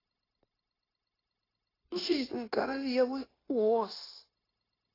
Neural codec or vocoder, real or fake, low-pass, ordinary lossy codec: codec, 16 kHz, 0.9 kbps, LongCat-Audio-Codec; fake; 5.4 kHz; AAC, 24 kbps